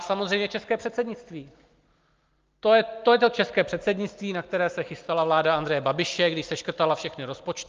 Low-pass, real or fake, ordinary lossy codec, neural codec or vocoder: 7.2 kHz; real; Opus, 32 kbps; none